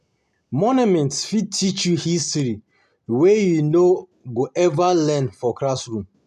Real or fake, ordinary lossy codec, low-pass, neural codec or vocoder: real; none; 14.4 kHz; none